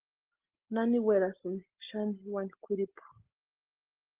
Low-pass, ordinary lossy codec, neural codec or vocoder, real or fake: 3.6 kHz; Opus, 16 kbps; none; real